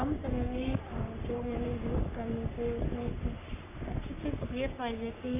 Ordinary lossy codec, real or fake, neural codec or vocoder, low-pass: none; fake; codec, 44.1 kHz, 3.4 kbps, Pupu-Codec; 3.6 kHz